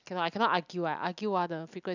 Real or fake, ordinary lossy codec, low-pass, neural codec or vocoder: real; none; 7.2 kHz; none